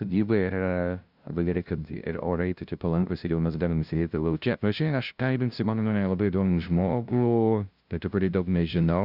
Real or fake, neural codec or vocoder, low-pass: fake; codec, 16 kHz, 0.5 kbps, FunCodec, trained on LibriTTS, 25 frames a second; 5.4 kHz